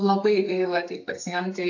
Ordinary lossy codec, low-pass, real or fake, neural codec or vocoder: AAC, 48 kbps; 7.2 kHz; fake; codec, 32 kHz, 1.9 kbps, SNAC